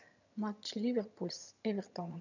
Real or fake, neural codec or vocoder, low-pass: fake; vocoder, 22.05 kHz, 80 mel bands, HiFi-GAN; 7.2 kHz